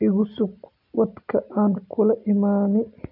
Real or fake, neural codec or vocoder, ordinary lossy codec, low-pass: real; none; none; 5.4 kHz